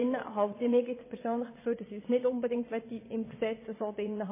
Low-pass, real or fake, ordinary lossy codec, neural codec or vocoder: 3.6 kHz; fake; MP3, 16 kbps; vocoder, 22.05 kHz, 80 mel bands, WaveNeXt